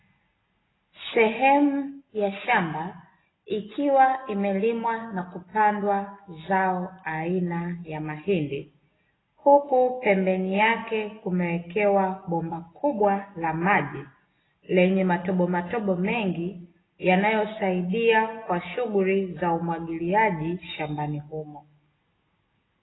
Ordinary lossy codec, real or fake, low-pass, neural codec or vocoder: AAC, 16 kbps; real; 7.2 kHz; none